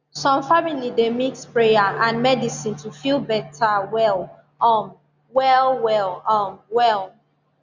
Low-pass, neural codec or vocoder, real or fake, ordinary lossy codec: 7.2 kHz; none; real; Opus, 64 kbps